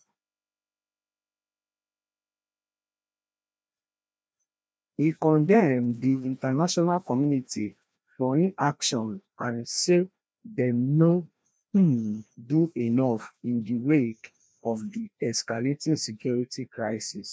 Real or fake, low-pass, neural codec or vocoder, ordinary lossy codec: fake; none; codec, 16 kHz, 1 kbps, FreqCodec, larger model; none